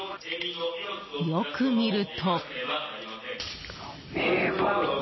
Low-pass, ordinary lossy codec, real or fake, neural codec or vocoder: 7.2 kHz; MP3, 24 kbps; real; none